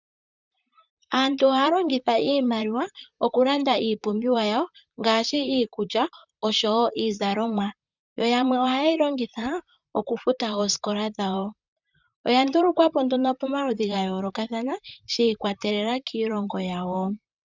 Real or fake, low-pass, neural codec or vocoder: fake; 7.2 kHz; vocoder, 44.1 kHz, 128 mel bands, Pupu-Vocoder